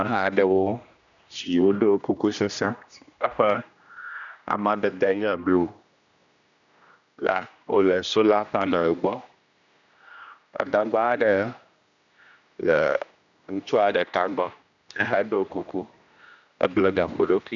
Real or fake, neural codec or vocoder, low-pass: fake; codec, 16 kHz, 1 kbps, X-Codec, HuBERT features, trained on general audio; 7.2 kHz